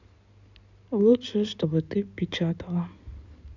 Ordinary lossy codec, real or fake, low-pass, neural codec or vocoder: none; fake; 7.2 kHz; codec, 16 kHz in and 24 kHz out, 2.2 kbps, FireRedTTS-2 codec